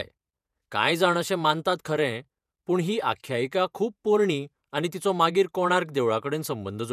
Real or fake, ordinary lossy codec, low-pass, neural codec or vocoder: fake; none; 14.4 kHz; vocoder, 48 kHz, 128 mel bands, Vocos